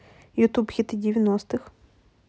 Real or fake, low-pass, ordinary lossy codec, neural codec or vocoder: real; none; none; none